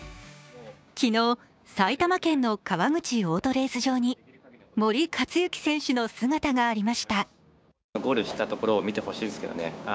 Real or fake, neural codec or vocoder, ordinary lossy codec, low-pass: fake; codec, 16 kHz, 6 kbps, DAC; none; none